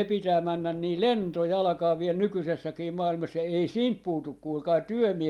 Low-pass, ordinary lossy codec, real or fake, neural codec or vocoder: 19.8 kHz; Opus, 32 kbps; real; none